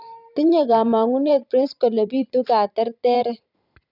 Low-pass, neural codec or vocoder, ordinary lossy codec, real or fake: 5.4 kHz; vocoder, 44.1 kHz, 128 mel bands every 512 samples, BigVGAN v2; none; fake